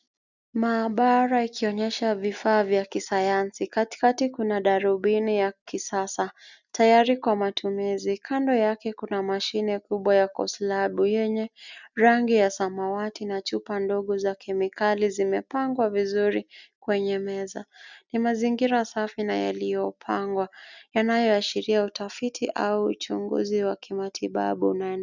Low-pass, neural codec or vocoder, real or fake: 7.2 kHz; none; real